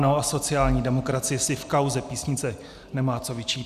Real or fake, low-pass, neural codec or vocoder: real; 14.4 kHz; none